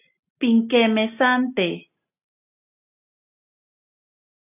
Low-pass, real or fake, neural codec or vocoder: 3.6 kHz; real; none